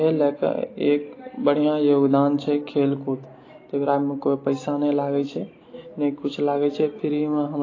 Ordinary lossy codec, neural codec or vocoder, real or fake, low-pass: AAC, 32 kbps; none; real; 7.2 kHz